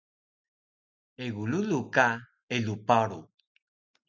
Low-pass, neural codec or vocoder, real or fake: 7.2 kHz; none; real